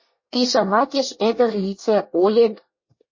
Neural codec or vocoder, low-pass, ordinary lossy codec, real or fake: codec, 24 kHz, 1 kbps, SNAC; 7.2 kHz; MP3, 32 kbps; fake